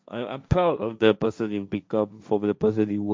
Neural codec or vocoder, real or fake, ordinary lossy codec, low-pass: codec, 16 kHz, 1.1 kbps, Voila-Tokenizer; fake; none; none